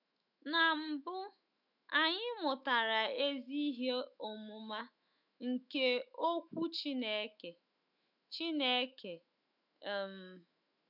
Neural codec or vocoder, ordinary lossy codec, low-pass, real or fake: autoencoder, 48 kHz, 128 numbers a frame, DAC-VAE, trained on Japanese speech; none; 5.4 kHz; fake